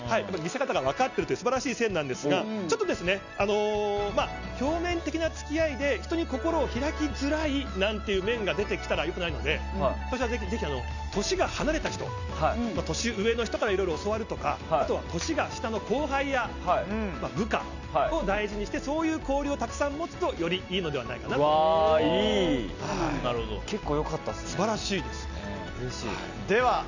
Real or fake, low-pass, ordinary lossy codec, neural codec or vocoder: real; 7.2 kHz; none; none